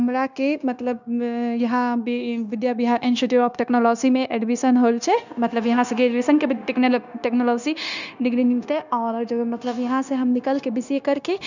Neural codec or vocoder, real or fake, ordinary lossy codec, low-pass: codec, 16 kHz, 0.9 kbps, LongCat-Audio-Codec; fake; none; 7.2 kHz